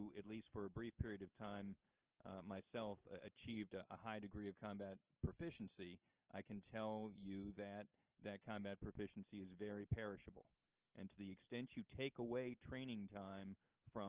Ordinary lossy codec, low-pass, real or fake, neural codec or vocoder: Opus, 16 kbps; 3.6 kHz; real; none